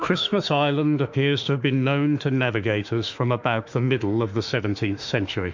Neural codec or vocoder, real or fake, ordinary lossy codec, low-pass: autoencoder, 48 kHz, 32 numbers a frame, DAC-VAE, trained on Japanese speech; fake; MP3, 64 kbps; 7.2 kHz